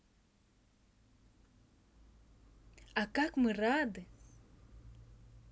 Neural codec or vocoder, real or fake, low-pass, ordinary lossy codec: none; real; none; none